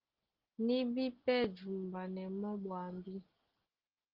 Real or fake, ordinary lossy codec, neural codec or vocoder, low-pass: real; Opus, 16 kbps; none; 5.4 kHz